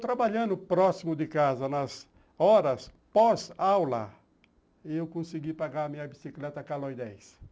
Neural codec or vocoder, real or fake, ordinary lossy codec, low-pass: none; real; none; none